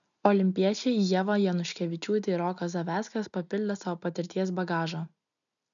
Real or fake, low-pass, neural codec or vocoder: real; 7.2 kHz; none